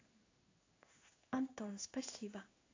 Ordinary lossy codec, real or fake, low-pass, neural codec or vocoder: AAC, 32 kbps; fake; 7.2 kHz; codec, 16 kHz in and 24 kHz out, 1 kbps, XY-Tokenizer